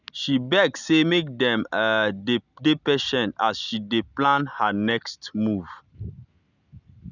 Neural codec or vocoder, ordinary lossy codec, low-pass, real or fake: none; none; 7.2 kHz; real